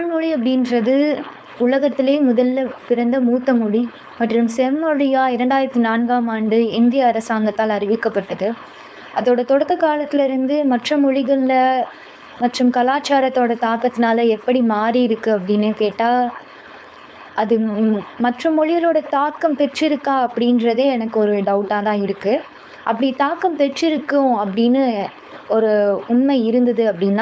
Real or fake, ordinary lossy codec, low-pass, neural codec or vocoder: fake; none; none; codec, 16 kHz, 4.8 kbps, FACodec